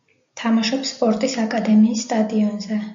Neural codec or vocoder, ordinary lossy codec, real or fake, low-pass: none; MP3, 48 kbps; real; 7.2 kHz